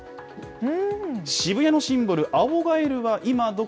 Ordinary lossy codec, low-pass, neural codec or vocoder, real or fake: none; none; none; real